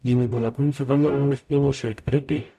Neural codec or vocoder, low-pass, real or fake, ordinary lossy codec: codec, 44.1 kHz, 0.9 kbps, DAC; 14.4 kHz; fake; none